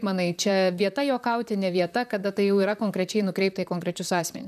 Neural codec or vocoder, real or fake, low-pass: vocoder, 44.1 kHz, 128 mel bands, Pupu-Vocoder; fake; 14.4 kHz